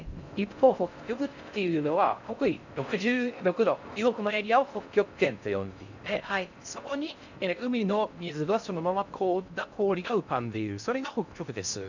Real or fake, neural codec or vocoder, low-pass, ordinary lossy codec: fake; codec, 16 kHz in and 24 kHz out, 0.6 kbps, FocalCodec, streaming, 4096 codes; 7.2 kHz; none